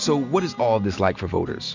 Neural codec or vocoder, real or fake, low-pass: none; real; 7.2 kHz